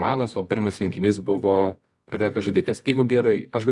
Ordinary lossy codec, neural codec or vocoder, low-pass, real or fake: Opus, 64 kbps; codec, 24 kHz, 0.9 kbps, WavTokenizer, medium music audio release; 10.8 kHz; fake